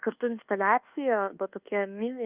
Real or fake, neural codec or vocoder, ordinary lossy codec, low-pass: fake; codec, 24 kHz, 1.2 kbps, DualCodec; Opus, 24 kbps; 3.6 kHz